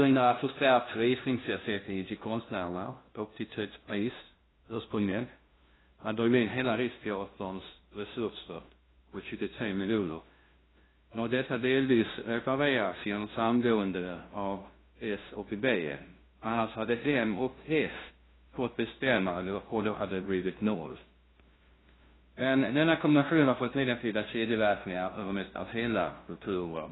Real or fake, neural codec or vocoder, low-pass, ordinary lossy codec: fake; codec, 16 kHz, 0.5 kbps, FunCodec, trained on LibriTTS, 25 frames a second; 7.2 kHz; AAC, 16 kbps